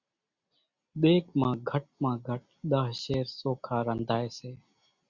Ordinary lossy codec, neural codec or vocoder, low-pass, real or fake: Opus, 64 kbps; none; 7.2 kHz; real